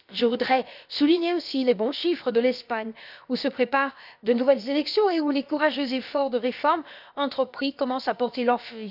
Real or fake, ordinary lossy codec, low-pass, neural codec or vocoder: fake; none; 5.4 kHz; codec, 16 kHz, about 1 kbps, DyCAST, with the encoder's durations